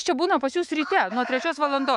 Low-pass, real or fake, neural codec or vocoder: 10.8 kHz; fake; autoencoder, 48 kHz, 128 numbers a frame, DAC-VAE, trained on Japanese speech